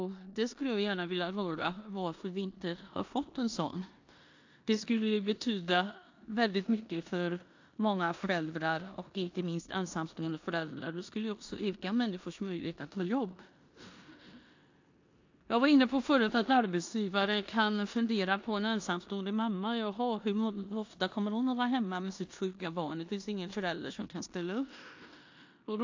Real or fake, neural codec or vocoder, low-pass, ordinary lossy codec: fake; codec, 16 kHz in and 24 kHz out, 0.9 kbps, LongCat-Audio-Codec, four codebook decoder; 7.2 kHz; AAC, 48 kbps